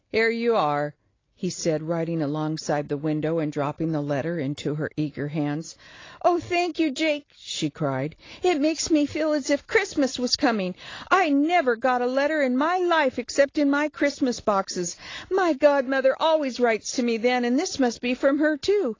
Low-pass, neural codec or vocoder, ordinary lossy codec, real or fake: 7.2 kHz; none; AAC, 32 kbps; real